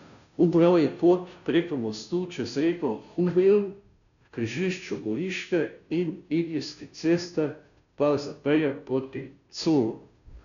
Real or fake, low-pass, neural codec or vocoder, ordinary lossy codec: fake; 7.2 kHz; codec, 16 kHz, 0.5 kbps, FunCodec, trained on Chinese and English, 25 frames a second; none